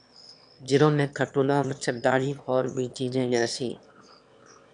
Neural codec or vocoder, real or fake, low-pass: autoencoder, 22.05 kHz, a latent of 192 numbers a frame, VITS, trained on one speaker; fake; 9.9 kHz